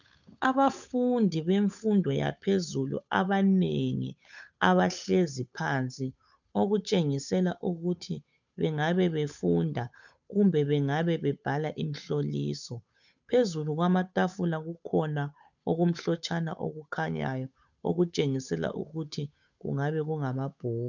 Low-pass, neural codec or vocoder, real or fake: 7.2 kHz; codec, 16 kHz, 8 kbps, FunCodec, trained on Chinese and English, 25 frames a second; fake